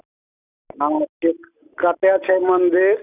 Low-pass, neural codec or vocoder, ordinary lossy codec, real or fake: 3.6 kHz; none; none; real